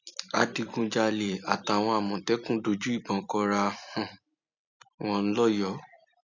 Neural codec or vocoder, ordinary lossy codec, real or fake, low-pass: vocoder, 44.1 kHz, 128 mel bands every 256 samples, BigVGAN v2; none; fake; 7.2 kHz